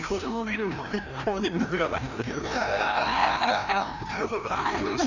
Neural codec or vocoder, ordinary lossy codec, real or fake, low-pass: codec, 16 kHz, 1 kbps, FreqCodec, larger model; none; fake; 7.2 kHz